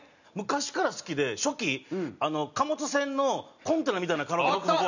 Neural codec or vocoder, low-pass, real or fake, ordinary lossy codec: none; 7.2 kHz; real; none